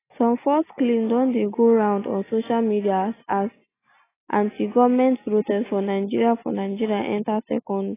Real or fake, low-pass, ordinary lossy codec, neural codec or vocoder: real; 3.6 kHz; AAC, 16 kbps; none